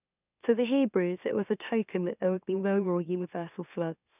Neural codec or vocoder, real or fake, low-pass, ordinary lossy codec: autoencoder, 44.1 kHz, a latent of 192 numbers a frame, MeloTTS; fake; 3.6 kHz; none